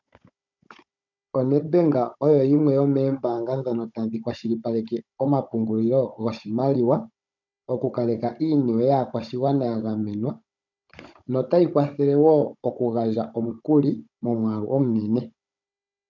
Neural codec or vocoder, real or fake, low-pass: codec, 16 kHz, 16 kbps, FunCodec, trained on Chinese and English, 50 frames a second; fake; 7.2 kHz